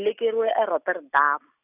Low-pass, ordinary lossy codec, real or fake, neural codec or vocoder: 3.6 kHz; none; real; none